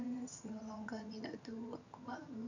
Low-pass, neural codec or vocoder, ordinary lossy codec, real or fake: 7.2 kHz; vocoder, 22.05 kHz, 80 mel bands, HiFi-GAN; none; fake